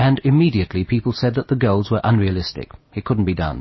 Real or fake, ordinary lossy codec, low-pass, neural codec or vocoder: real; MP3, 24 kbps; 7.2 kHz; none